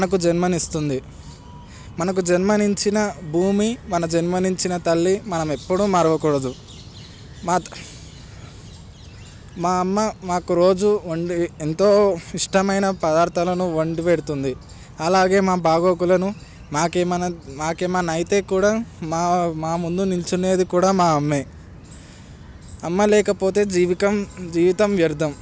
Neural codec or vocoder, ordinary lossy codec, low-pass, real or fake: none; none; none; real